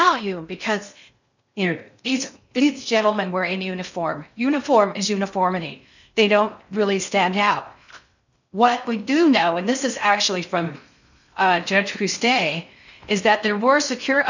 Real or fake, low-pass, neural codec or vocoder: fake; 7.2 kHz; codec, 16 kHz in and 24 kHz out, 0.6 kbps, FocalCodec, streaming, 2048 codes